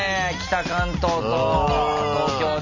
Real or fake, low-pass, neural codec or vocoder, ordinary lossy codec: real; 7.2 kHz; none; none